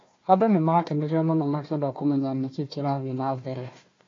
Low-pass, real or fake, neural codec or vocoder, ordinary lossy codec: 7.2 kHz; fake; codec, 16 kHz, 1 kbps, FunCodec, trained on Chinese and English, 50 frames a second; AAC, 32 kbps